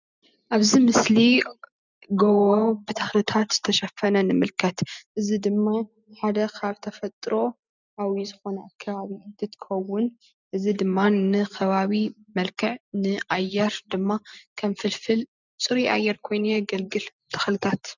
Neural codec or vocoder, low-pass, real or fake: none; 7.2 kHz; real